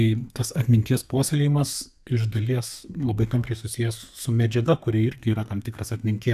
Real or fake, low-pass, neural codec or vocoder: fake; 14.4 kHz; codec, 32 kHz, 1.9 kbps, SNAC